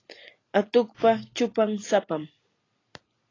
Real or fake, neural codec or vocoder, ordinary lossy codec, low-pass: real; none; AAC, 32 kbps; 7.2 kHz